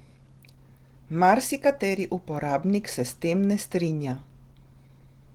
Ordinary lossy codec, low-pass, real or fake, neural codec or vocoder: Opus, 16 kbps; 19.8 kHz; real; none